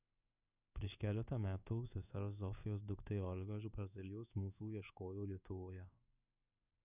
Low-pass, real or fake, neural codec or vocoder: 3.6 kHz; fake; codec, 16 kHz in and 24 kHz out, 1 kbps, XY-Tokenizer